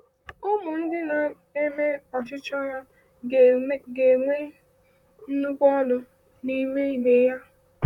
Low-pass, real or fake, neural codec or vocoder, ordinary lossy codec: 19.8 kHz; fake; vocoder, 44.1 kHz, 128 mel bands, Pupu-Vocoder; none